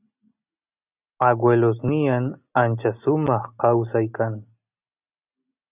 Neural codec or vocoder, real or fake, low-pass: none; real; 3.6 kHz